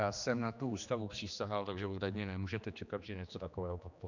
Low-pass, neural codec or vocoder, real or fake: 7.2 kHz; codec, 16 kHz, 2 kbps, X-Codec, HuBERT features, trained on general audio; fake